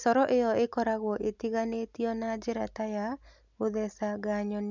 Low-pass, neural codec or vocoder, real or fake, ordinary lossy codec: 7.2 kHz; none; real; none